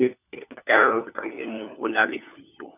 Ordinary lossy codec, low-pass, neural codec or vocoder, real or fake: none; 3.6 kHz; codec, 16 kHz, 4 kbps, FunCodec, trained on LibriTTS, 50 frames a second; fake